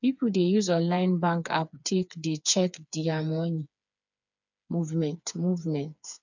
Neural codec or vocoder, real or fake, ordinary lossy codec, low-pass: codec, 16 kHz, 4 kbps, FreqCodec, smaller model; fake; none; 7.2 kHz